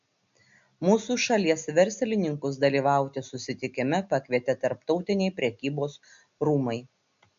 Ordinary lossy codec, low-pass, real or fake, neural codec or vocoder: MP3, 64 kbps; 7.2 kHz; real; none